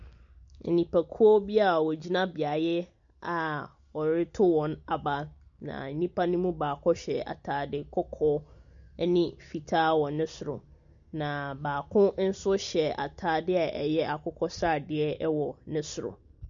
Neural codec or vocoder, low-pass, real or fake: none; 7.2 kHz; real